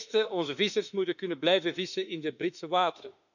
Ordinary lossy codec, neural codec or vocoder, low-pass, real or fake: none; autoencoder, 48 kHz, 32 numbers a frame, DAC-VAE, trained on Japanese speech; 7.2 kHz; fake